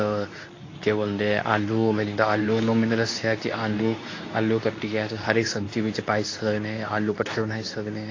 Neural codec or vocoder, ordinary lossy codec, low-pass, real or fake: codec, 24 kHz, 0.9 kbps, WavTokenizer, medium speech release version 2; AAC, 32 kbps; 7.2 kHz; fake